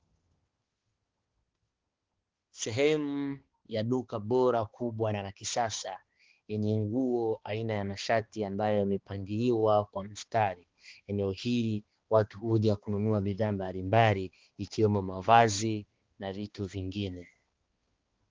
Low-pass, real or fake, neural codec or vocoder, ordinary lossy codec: 7.2 kHz; fake; codec, 16 kHz, 2 kbps, X-Codec, HuBERT features, trained on balanced general audio; Opus, 16 kbps